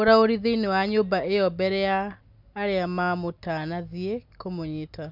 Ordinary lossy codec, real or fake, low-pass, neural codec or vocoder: none; real; 5.4 kHz; none